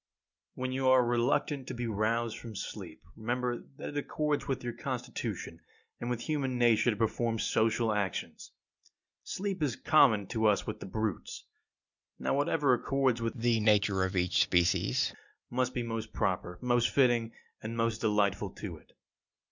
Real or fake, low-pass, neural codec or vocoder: real; 7.2 kHz; none